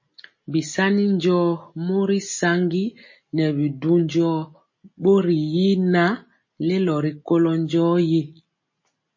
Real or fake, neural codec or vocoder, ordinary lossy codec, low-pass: real; none; MP3, 32 kbps; 7.2 kHz